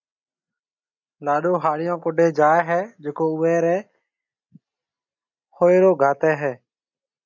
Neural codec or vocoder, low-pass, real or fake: none; 7.2 kHz; real